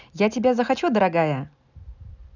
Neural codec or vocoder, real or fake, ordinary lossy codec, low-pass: none; real; none; 7.2 kHz